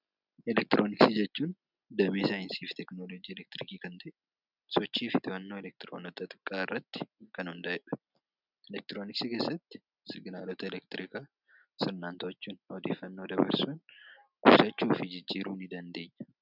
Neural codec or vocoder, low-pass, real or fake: none; 5.4 kHz; real